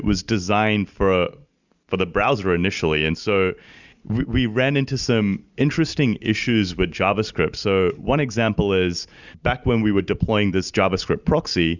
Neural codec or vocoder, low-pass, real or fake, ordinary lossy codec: none; 7.2 kHz; real; Opus, 64 kbps